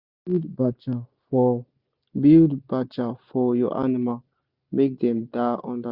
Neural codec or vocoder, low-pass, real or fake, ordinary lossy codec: none; 5.4 kHz; real; Opus, 64 kbps